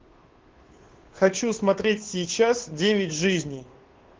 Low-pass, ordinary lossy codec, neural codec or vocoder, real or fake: 7.2 kHz; Opus, 16 kbps; codec, 16 kHz, 2 kbps, FunCodec, trained on Chinese and English, 25 frames a second; fake